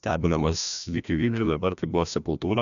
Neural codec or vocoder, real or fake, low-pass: codec, 16 kHz, 1 kbps, FreqCodec, larger model; fake; 7.2 kHz